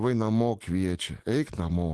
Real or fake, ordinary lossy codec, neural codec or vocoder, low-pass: fake; Opus, 16 kbps; autoencoder, 48 kHz, 128 numbers a frame, DAC-VAE, trained on Japanese speech; 10.8 kHz